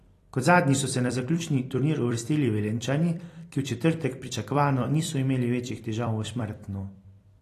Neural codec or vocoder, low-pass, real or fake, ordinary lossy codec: vocoder, 44.1 kHz, 128 mel bands every 256 samples, BigVGAN v2; 14.4 kHz; fake; AAC, 48 kbps